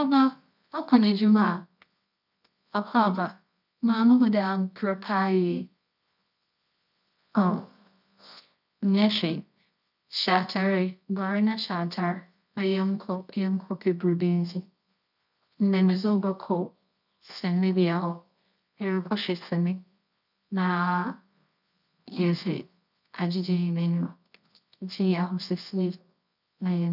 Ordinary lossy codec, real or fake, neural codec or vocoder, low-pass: none; fake; codec, 24 kHz, 0.9 kbps, WavTokenizer, medium music audio release; 5.4 kHz